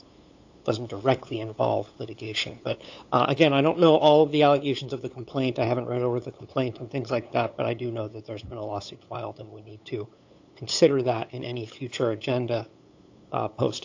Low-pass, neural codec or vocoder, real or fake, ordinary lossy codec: 7.2 kHz; codec, 16 kHz, 8 kbps, FunCodec, trained on LibriTTS, 25 frames a second; fake; AAC, 48 kbps